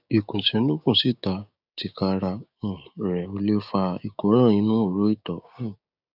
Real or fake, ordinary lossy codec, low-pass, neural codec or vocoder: fake; none; 5.4 kHz; codec, 16 kHz, 6 kbps, DAC